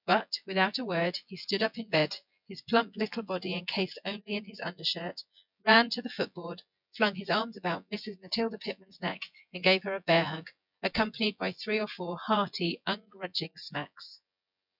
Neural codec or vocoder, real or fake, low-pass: vocoder, 24 kHz, 100 mel bands, Vocos; fake; 5.4 kHz